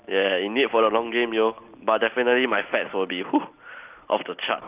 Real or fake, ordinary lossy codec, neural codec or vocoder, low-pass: real; Opus, 24 kbps; none; 3.6 kHz